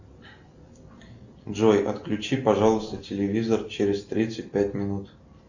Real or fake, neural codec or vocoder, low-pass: real; none; 7.2 kHz